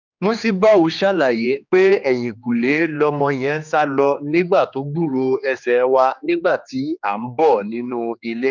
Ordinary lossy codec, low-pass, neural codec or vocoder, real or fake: none; 7.2 kHz; codec, 16 kHz, 2 kbps, X-Codec, HuBERT features, trained on general audio; fake